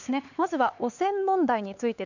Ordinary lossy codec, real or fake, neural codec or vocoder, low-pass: none; fake; codec, 16 kHz, 4 kbps, X-Codec, HuBERT features, trained on LibriSpeech; 7.2 kHz